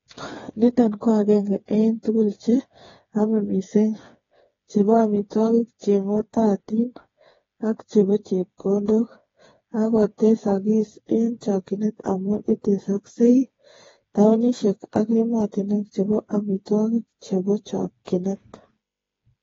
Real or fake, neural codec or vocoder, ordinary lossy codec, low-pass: fake; codec, 16 kHz, 4 kbps, FreqCodec, smaller model; AAC, 24 kbps; 7.2 kHz